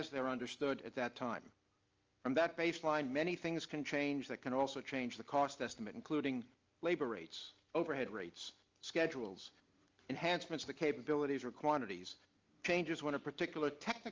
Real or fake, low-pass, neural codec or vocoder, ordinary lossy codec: real; 7.2 kHz; none; Opus, 24 kbps